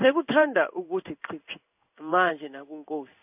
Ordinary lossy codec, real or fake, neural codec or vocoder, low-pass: none; fake; codec, 16 kHz in and 24 kHz out, 1 kbps, XY-Tokenizer; 3.6 kHz